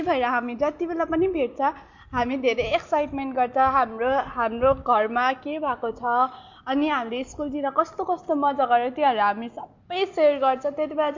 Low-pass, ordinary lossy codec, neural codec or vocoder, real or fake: 7.2 kHz; MP3, 48 kbps; none; real